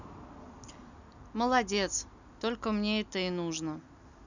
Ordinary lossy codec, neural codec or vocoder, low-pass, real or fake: none; none; 7.2 kHz; real